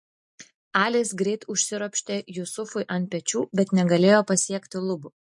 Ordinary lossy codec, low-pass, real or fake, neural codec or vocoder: MP3, 48 kbps; 10.8 kHz; real; none